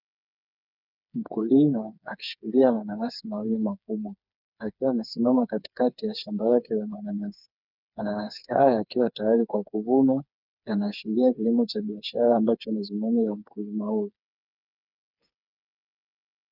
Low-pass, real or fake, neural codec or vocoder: 5.4 kHz; fake; codec, 16 kHz, 4 kbps, FreqCodec, smaller model